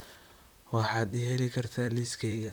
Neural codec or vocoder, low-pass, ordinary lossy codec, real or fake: vocoder, 44.1 kHz, 128 mel bands, Pupu-Vocoder; none; none; fake